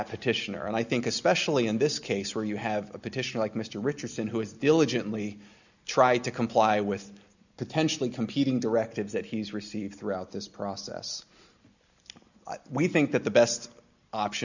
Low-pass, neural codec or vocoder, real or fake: 7.2 kHz; none; real